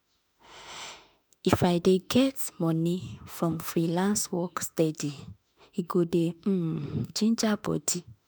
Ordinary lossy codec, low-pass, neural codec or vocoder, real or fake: none; none; autoencoder, 48 kHz, 32 numbers a frame, DAC-VAE, trained on Japanese speech; fake